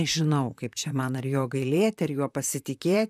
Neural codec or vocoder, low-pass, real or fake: vocoder, 44.1 kHz, 128 mel bands, Pupu-Vocoder; 14.4 kHz; fake